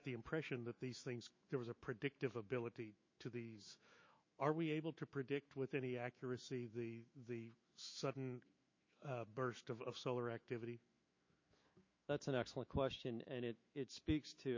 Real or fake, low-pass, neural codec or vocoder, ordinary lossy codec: fake; 7.2 kHz; autoencoder, 48 kHz, 128 numbers a frame, DAC-VAE, trained on Japanese speech; MP3, 32 kbps